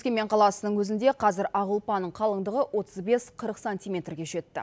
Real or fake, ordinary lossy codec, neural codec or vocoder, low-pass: real; none; none; none